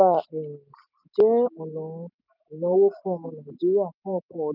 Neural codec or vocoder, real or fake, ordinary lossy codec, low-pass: none; real; none; 5.4 kHz